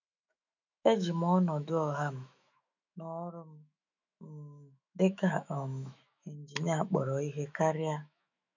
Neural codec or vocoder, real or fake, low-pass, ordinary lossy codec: autoencoder, 48 kHz, 128 numbers a frame, DAC-VAE, trained on Japanese speech; fake; 7.2 kHz; none